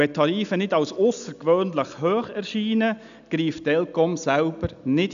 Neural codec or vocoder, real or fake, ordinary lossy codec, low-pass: none; real; none; 7.2 kHz